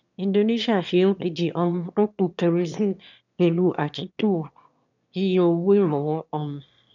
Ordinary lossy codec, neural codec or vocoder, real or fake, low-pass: none; autoencoder, 22.05 kHz, a latent of 192 numbers a frame, VITS, trained on one speaker; fake; 7.2 kHz